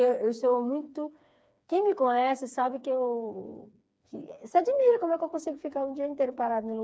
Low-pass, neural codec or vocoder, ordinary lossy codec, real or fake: none; codec, 16 kHz, 4 kbps, FreqCodec, smaller model; none; fake